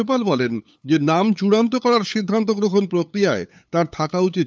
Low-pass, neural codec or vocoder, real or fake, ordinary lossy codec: none; codec, 16 kHz, 8 kbps, FunCodec, trained on LibriTTS, 25 frames a second; fake; none